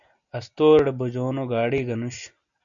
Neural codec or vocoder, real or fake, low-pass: none; real; 7.2 kHz